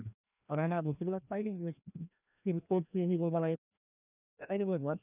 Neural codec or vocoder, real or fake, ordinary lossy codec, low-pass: codec, 16 kHz, 1 kbps, FreqCodec, larger model; fake; none; 3.6 kHz